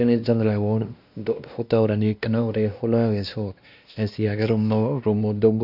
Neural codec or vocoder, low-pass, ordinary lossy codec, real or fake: codec, 16 kHz, 1 kbps, X-Codec, WavLM features, trained on Multilingual LibriSpeech; 5.4 kHz; none; fake